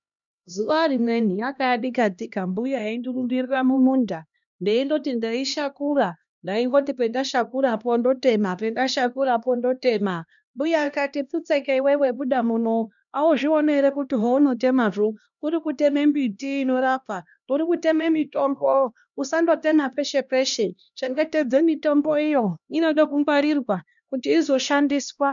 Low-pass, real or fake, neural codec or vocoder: 7.2 kHz; fake; codec, 16 kHz, 1 kbps, X-Codec, HuBERT features, trained on LibriSpeech